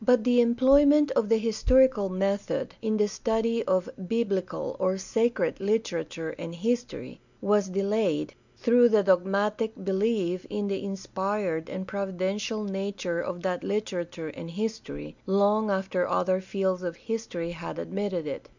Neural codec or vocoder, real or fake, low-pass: none; real; 7.2 kHz